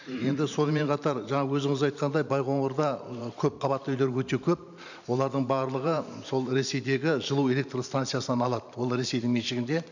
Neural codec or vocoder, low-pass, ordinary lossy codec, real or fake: vocoder, 44.1 kHz, 128 mel bands every 512 samples, BigVGAN v2; 7.2 kHz; none; fake